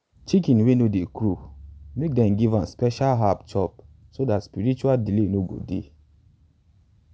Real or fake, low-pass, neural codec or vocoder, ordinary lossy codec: real; none; none; none